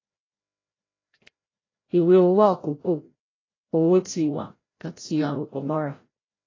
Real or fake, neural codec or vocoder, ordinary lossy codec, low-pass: fake; codec, 16 kHz, 0.5 kbps, FreqCodec, larger model; AAC, 32 kbps; 7.2 kHz